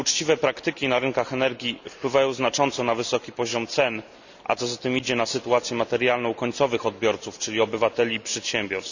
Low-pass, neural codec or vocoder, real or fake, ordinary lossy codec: 7.2 kHz; none; real; none